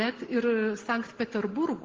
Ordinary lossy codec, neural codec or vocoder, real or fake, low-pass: Opus, 16 kbps; none; real; 7.2 kHz